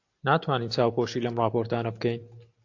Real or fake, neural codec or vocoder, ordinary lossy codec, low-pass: real; none; AAC, 48 kbps; 7.2 kHz